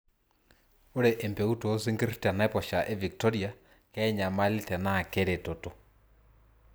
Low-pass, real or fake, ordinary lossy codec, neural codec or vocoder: none; real; none; none